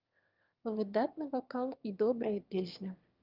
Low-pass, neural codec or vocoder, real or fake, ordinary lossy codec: 5.4 kHz; autoencoder, 22.05 kHz, a latent of 192 numbers a frame, VITS, trained on one speaker; fake; Opus, 32 kbps